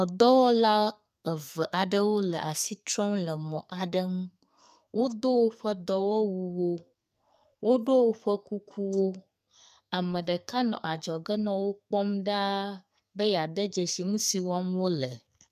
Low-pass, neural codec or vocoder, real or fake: 14.4 kHz; codec, 44.1 kHz, 2.6 kbps, SNAC; fake